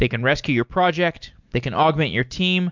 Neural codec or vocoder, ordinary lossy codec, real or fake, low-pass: none; MP3, 64 kbps; real; 7.2 kHz